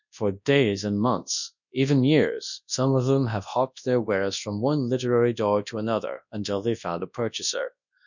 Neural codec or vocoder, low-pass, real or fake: codec, 24 kHz, 0.9 kbps, WavTokenizer, large speech release; 7.2 kHz; fake